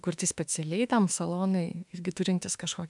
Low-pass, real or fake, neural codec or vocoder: 10.8 kHz; fake; autoencoder, 48 kHz, 32 numbers a frame, DAC-VAE, trained on Japanese speech